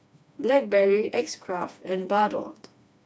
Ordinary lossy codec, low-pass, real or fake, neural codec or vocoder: none; none; fake; codec, 16 kHz, 2 kbps, FreqCodec, smaller model